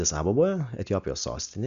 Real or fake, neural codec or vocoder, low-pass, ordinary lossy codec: real; none; 7.2 kHz; Opus, 64 kbps